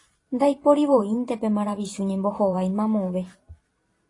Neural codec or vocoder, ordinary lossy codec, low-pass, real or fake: vocoder, 44.1 kHz, 128 mel bands every 512 samples, BigVGAN v2; AAC, 32 kbps; 10.8 kHz; fake